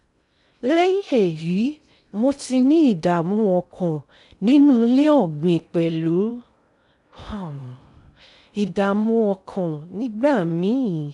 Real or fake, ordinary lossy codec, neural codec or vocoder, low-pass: fake; none; codec, 16 kHz in and 24 kHz out, 0.6 kbps, FocalCodec, streaming, 2048 codes; 10.8 kHz